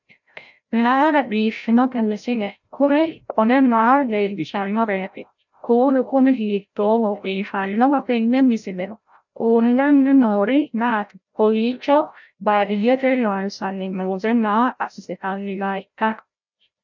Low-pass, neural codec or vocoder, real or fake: 7.2 kHz; codec, 16 kHz, 0.5 kbps, FreqCodec, larger model; fake